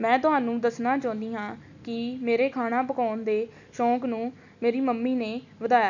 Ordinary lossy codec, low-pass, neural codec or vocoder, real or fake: none; 7.2 kHz; none; real